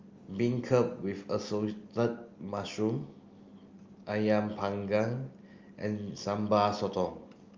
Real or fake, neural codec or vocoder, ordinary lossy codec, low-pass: real; none; Opus, 32 kbps; 7.2 kHz